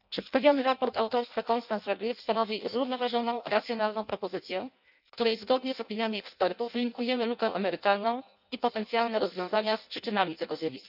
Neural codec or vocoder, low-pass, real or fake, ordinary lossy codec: codec, 16 kHz in and 24 kHz out, 0.6 kbps, FireRedTTS-2 codec; 5.4 kHz; fake; none